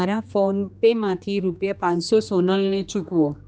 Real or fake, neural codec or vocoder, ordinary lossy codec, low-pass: fake; codec, 16 kHz, 2 kbps, X-Codec, HuBERT features, trained on general audio; none; none